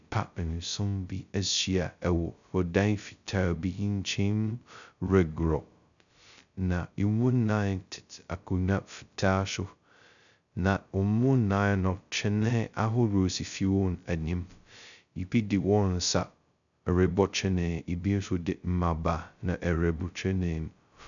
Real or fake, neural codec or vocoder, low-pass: fake; codec, 16 kHz, 0.2 kbps, FocalCodec; 7.2 kHz